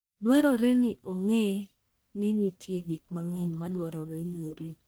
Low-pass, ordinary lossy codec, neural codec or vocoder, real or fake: none; none; codec, 44.1 kHz, 1.7 kbps, Pupu-Codec; fake